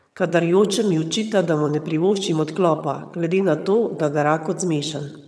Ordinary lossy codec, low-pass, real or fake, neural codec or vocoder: none; none; fake; vocoder, 22.05 kHz, 80 mel bands, HiFi-GAN